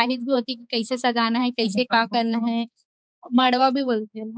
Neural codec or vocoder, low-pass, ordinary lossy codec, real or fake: codec, 16 kHz, 4 kbps, X-Codec, HuBERT features, trained on balanced general audio; none; none; fake